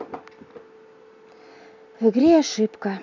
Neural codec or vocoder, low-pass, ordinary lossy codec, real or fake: none; 7.2 kHz; none; real